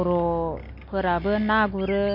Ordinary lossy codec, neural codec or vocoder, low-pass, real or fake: MP3, 32 kbps; none; 5.4 kHz; real